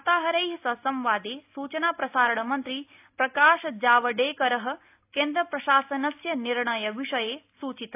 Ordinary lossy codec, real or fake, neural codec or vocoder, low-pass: AAC, 32 kbps; real; none; 3.6 kHz